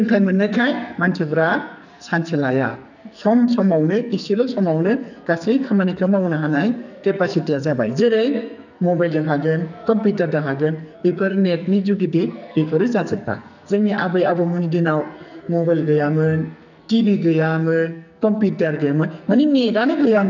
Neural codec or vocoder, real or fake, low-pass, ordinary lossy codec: codec, 44.1 kHz, 2.6 kbps, SNAC; fake; 7.2 kHz; none